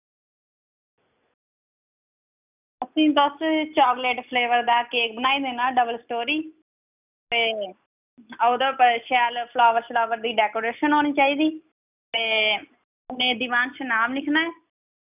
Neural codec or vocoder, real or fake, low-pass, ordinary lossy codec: none; real; 3.6 kHz; none